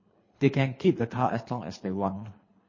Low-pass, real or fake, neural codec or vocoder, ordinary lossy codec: 7.2 kHz; fake; codec, 24 kHz, 3 kbps, HILCodec; MP3, 32 kbps